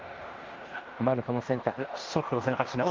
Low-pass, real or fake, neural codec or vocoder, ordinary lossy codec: 7.2 kHz; fake; codec, 16 kHz in and 24 kHz out, 0.9 kbps, LongCat-Audio-Codec, fine tuned four codebook decoder; Opus, 32 kbps